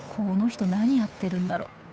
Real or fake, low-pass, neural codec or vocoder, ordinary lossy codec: fake; none; codec, 16 kHz, 2 kbps, FunCodec, trained on Chinese and English, 25 frames a second; none